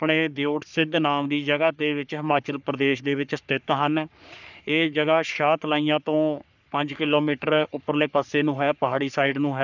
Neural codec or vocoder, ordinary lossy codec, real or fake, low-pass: codec, 44.1 kHz, 3.4 kbps, Pupu-Codec; none; fake; 7.2 kHz